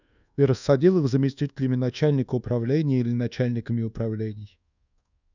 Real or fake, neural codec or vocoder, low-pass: fake; codec, 24 kHz, 1.2 kbps, DualCodec; 7.2 kHz